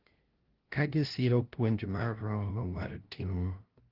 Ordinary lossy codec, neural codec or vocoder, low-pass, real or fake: Opus, 16 kbps; codec, 16 kHz, 0.5 kbps, FunCodec, trained on LibriTTS, 25 frames a second; 5.4 kHz; fake